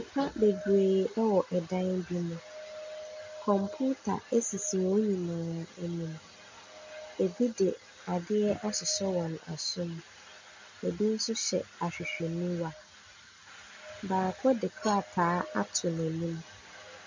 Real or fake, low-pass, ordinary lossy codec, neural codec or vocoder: real; 7.2 kHz; MP3, 64 kbps; none